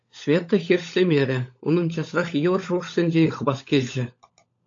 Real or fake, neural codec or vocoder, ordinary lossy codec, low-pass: fake; codec, 16 kHz, 16 kbps, FunCodec, trained on LibriTTS, 50 frames a second; AAC, 48 kbps; 7.2 kHz